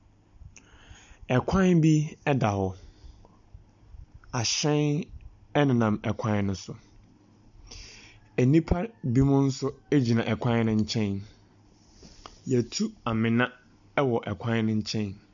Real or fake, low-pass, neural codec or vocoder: real; 7.2 kHz; none